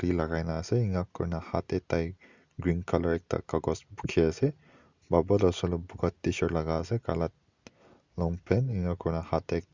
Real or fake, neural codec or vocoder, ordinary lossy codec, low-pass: real; none; Opus, 64 kbps; 7.2 kHz